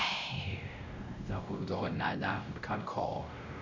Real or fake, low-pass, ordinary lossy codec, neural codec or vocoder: fake; 7.2 kHz; MP3, 64 kbps; codec, 16 kHz, 0.5 kbps, X-Codec, WavLM features, trained on Multilingual LibriSpeech